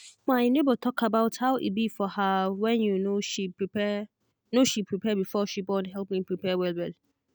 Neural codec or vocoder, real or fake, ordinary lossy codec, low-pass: none; real; none; none